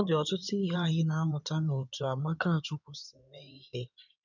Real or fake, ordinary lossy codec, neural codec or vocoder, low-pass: fake; none; codec, 16 kHz in and 24 kHz out, 2.2 kbps, FireRedTTS-2 codec; 7.2 kHz